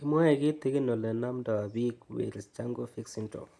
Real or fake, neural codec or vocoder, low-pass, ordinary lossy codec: real; none; none; none